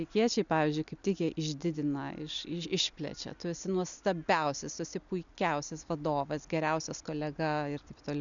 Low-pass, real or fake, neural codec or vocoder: 7.2 kHz; real; none